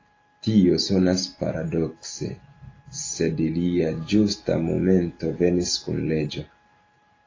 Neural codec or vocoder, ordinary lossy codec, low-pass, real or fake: none; AAC, 32 kbps; 7.2 kHz; real